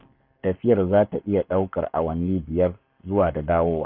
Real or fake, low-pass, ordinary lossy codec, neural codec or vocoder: fake; 5.4 kHz; none; codec, 44.1 kHz, 7.8 kbps, Pupu-Codec